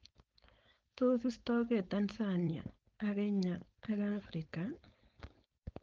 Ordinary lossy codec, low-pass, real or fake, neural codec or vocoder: Opus, 24 kbps; 7.2 kHz; fake; codec, 16 kHz, 4.8 kbps, FACodec